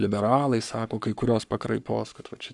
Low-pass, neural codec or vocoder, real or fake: 10.8 kHz; codec, 44.1 kHz, 7.8 kbps, Pupu-Codec; fake